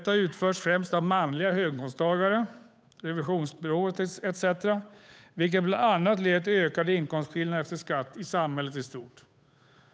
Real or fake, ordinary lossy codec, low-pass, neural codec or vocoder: fake; none; none; codec, 16 kHz, 8 kbps, FunCodec, trained on Chinese and English, 25 frames a second